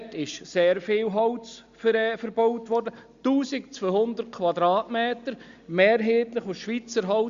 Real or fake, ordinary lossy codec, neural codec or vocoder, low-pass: real; Opus, 64 kbps; none; 7.2 kHz